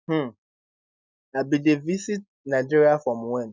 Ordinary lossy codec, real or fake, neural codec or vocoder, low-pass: none; real; none; none